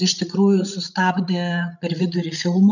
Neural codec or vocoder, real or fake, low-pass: codec, 16 kHz, 16 kbps, FreqCodec, larger model; fake; 7.2 kHz